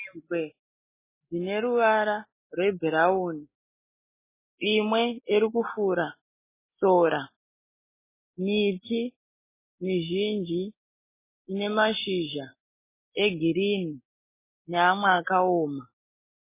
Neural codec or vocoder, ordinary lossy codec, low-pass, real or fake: none; MP3, 16 kbps; 3.6 kHz; real